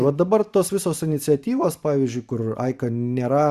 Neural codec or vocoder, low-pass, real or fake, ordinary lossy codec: vocoder, 44.1 kHz, 128 mel bands every 512 samples, BigVGAN v2; 14.4 kHz; fake; Opus, 64 kbps